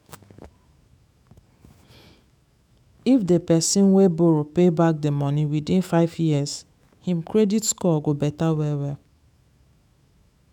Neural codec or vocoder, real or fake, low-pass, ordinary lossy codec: autoencoder, 48 kHz, 128 numbers a frame, DAC-VAE, trained on Japanese speech; fake; 19.8 kHz; none